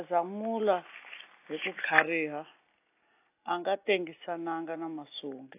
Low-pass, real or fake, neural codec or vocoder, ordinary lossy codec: 3.6 kHz; real; none; AAC, 24 kbps